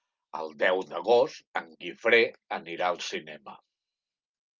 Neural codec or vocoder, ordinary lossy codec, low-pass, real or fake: none; Opus, 24 kbps; 7.2 kHz; real